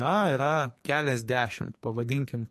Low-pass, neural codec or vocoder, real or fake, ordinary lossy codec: 14.4 kHz; codec, 44.1 kHz, 2.6 kbps, SNAC; fake; MP3, 64 kbps